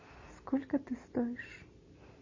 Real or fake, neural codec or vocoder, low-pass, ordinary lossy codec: real; none; 7.2 kHz; MP3, 32 kbps